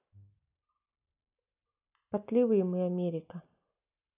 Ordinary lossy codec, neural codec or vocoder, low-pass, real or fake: none; none; 3.6 kHz; real